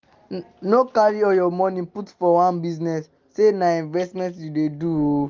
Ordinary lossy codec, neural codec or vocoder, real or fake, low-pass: Opus, 32 kbps; none; real; 7.2 kHz